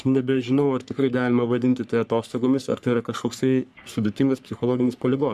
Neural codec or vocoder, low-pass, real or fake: codec, 44.1 kHz, 3.4 kbps, Pupu-Codec; 14.4 kHz; fake